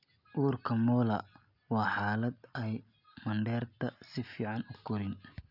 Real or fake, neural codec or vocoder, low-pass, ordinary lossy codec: real; none; 5.4 kHz; none